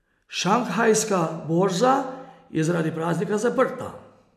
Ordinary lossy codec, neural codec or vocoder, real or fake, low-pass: none; none; real; 14.4 kHz